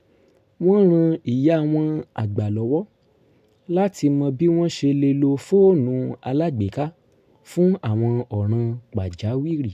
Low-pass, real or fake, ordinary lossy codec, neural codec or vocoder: 14.4 kHz; real; MP3, 96 kbps; none